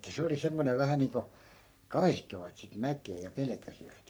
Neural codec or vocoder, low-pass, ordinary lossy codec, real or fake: codec, 44.1 kHz, 3.4 kbps, Pupu-Codec; none; none; fake